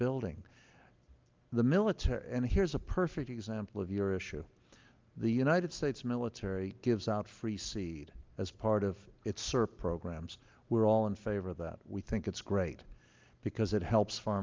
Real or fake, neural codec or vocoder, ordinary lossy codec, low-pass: real; none; Opus, 32 kbps; 7.2 kHz